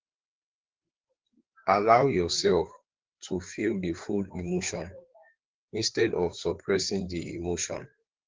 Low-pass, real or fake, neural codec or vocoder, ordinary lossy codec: 7.2 kHz; fake; codec, 16 kHz, 4 kbps, FreqCodec, larger model; Opus, 16 kbps